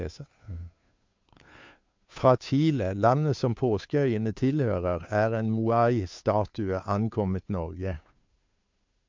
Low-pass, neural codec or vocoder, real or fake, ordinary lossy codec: 7.2 kHz; codec, 16 kHz, 2 kbps, FunCodec, trained on Chinese and English, 25 frames a second; fake; MP3, 64 kbps